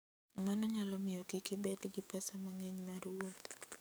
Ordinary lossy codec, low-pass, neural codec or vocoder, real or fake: none; none; codec, 44.1 kHz, 7.8 kbps, DAC; fake